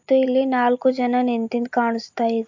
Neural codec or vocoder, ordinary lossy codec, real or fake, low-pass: none; MP3, 48 kbps; real; 7.2 kHz